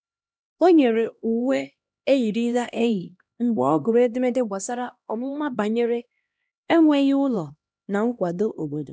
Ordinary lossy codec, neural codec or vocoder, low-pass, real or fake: none; codec, 16 kHz, 1 kbps, X-Codec, HuBERT features, trained on LibriSpeech; none; fake